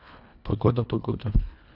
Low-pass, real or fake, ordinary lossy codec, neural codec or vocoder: 5.4 kHz; fake; none; codec, 24 kHz, 1.5 kbps, HILCodec